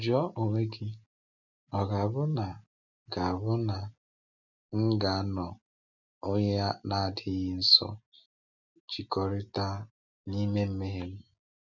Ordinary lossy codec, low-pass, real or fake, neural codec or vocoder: none; 7.2 kHz; real; none